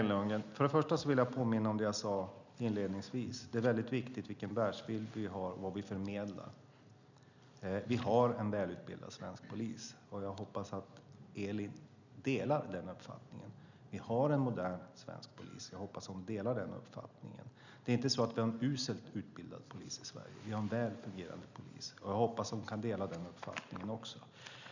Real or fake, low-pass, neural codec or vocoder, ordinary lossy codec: real; 7.2 kHz; none; none